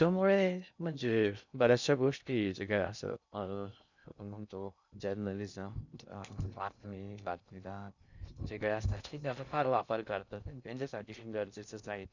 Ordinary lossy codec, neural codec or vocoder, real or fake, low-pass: none; codec, 16 kHz in and 24 kHz out, 0.6 kbps, FocalCodec, streaming, 2048 codes; fake; 7.2 kHz